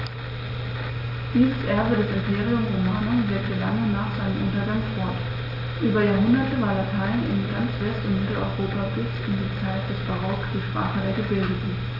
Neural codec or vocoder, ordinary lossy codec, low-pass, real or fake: none; AAC, 48 kbps; 5.4 kHz; real